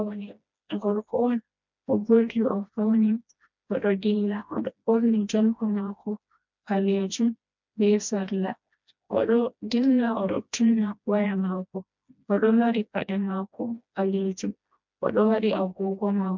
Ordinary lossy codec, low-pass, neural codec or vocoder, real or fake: MP3, 64 kbps; 7.2 kHz; codec, 16 kHz, 1 kbps, FreqCodec, smaller model; fake